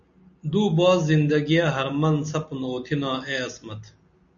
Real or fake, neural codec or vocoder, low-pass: real; none; 7.2 kHz